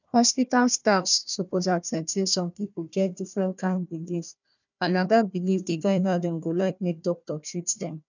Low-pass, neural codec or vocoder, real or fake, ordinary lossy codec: 7.2 kHz; codec, 16 kHz, 1 kbps, FunCodec, trained on Chinese and English, 50 frames a second; fake; none